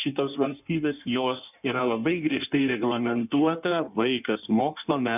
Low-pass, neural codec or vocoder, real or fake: 3.6 kHz; codec, 16 kHz in and 24 kHz out, 1.1 kbps, FireRedTTS-2 codec; fake